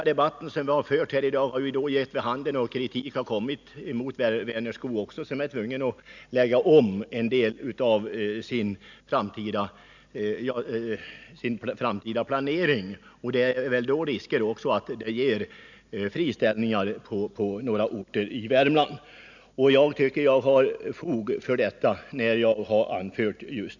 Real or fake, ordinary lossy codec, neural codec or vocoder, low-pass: real; none; none; 7.2 kHz